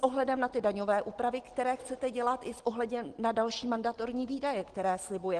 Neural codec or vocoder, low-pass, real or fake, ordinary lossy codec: none; 9.9 kHz; real; Opus, 16 kbps